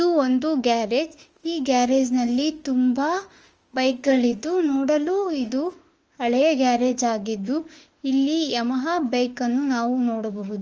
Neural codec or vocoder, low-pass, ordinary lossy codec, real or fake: autoencoder, 48 kHz, 32 numbers a frame, DAC-VAE, trained on Japanese speech; 7.2 kHz; Opus, 24 kbps; fake